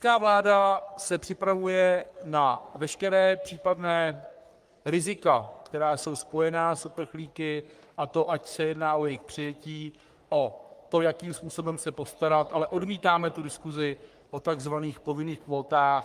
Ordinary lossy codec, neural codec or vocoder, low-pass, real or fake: Opus, 32 kbps; codec, 44.1 kHz, 3.4 kbps, Pupu-Codec; 14.4 kHz; fake